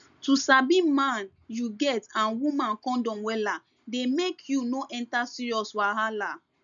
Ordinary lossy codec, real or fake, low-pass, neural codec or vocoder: none; real; 7.2 kHz; none